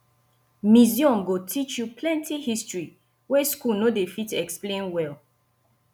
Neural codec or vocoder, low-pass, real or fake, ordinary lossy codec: none; none; real; none